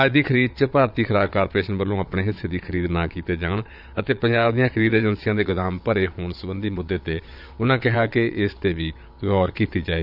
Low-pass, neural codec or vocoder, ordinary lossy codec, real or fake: 5.4 kHz; vocoder, 22.05 kHz, 80 mel bands, Vocos; none; fake